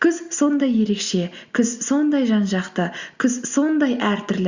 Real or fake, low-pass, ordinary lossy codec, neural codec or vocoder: real; 7.2 kHz; Opus, 64 kbps; none